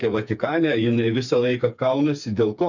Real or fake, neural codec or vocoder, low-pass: fake; codec, 16 kHz, 4 kbps, FreqCodec, smaller model; 7.2 kHz